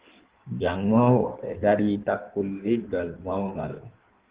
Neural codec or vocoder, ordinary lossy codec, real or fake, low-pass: codec, 16 kHz in and 24 kHz out, 1.1 kbps, FireRedTTS-2 codec; Opus, 16 kbps; fake; 3.6 kHz